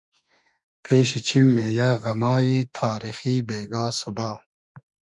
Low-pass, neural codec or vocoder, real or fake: 10.8 kHz; autoencoder, 48 kHz, 32 numbers a frame, DAC-VAE, trained on Japanese speech; fake